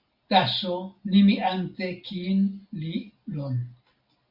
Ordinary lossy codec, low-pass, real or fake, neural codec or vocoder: Opus, 64 kbps; 5.4 kHz; real; none